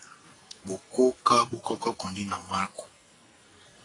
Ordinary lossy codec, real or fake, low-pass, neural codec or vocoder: AAC, 32 kbps; fake; 10.8 kHz; codec, 44.1 kHz, 2.6 kbps, SNAC